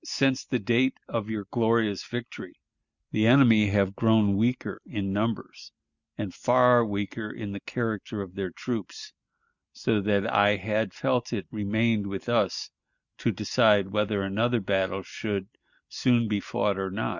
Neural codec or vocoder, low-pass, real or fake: none; 7.2 kHz; real